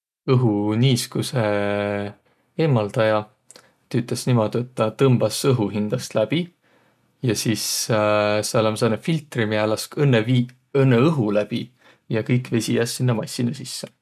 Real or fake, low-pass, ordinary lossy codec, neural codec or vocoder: real; 14.4 kHz; none; none